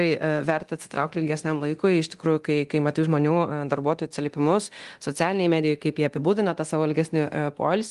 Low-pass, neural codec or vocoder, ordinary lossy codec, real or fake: 10.8 kHz; codec, 24 kHz, 0.9 kbps, DualCodec; Opus, 24 kbps; fake